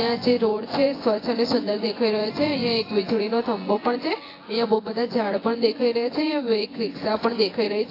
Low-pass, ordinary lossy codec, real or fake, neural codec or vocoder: 5.4 kHz; AAC, 24 kbps; fake; vocoder, 24 kHz, 100 mel bands, Vocos